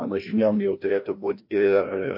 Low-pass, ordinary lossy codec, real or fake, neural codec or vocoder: 5.4 kHz; MP3, 32 kbps; fake; codec, 16 kHz, 1 kbps, FreqCodec, larger model